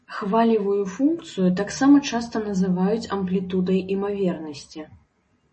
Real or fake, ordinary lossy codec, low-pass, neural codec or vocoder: real; MP3, 32 kbps; 10.8 kHz; none